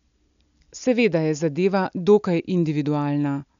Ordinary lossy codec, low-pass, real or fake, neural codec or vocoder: none; 7.2 kHz; real; none